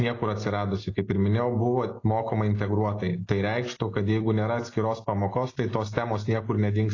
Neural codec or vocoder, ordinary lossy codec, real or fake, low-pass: none; AAC, 32 kbps; real; 7.2 kHz